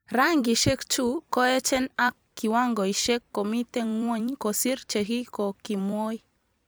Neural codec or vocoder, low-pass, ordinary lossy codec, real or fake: vocoder, 44.1 kHz, 128 mel bands every 256 samples, BigVGAN v2; none; none; fake